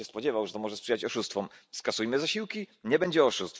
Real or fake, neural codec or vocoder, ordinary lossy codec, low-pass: real; none; none; none